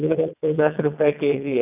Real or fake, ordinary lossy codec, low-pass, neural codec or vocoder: fake; none; 3.6 kHz; vocoder, 22.05 kHz, 80 mel bands, WaveNeXt